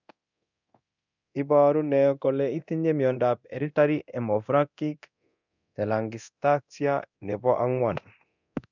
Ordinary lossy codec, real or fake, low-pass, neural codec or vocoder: none; fake; 7.2 kHz; codec, 24 kHz, 0.9 kbps, DualCodec